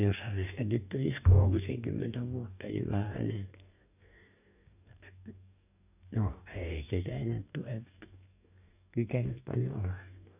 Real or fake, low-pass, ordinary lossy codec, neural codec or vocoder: fake; 3.6 kHz; none; codec, 44.1 kHz, 2.6 kbps, DAC